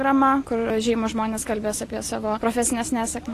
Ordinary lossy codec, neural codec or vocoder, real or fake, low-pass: AAC, 48 kbps; none; real; 14.4 kHz